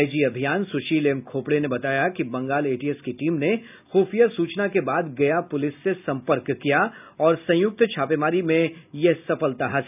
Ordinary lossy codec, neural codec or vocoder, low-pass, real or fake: none; none; 3.6 kHz; real